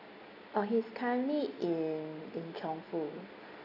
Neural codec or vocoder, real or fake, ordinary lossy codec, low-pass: none; real; AAC, 32 kbps; 5.4 kHz